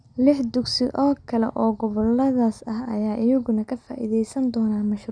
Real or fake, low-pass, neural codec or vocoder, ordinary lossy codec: real; 9.9 kHz; none; none